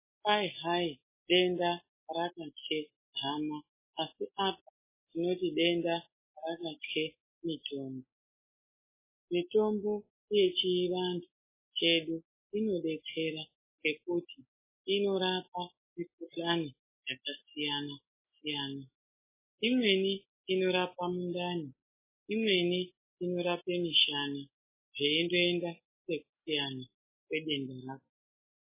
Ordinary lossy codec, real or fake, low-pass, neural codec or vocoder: MP3, 16 kbps; real; 3.6 kHz; none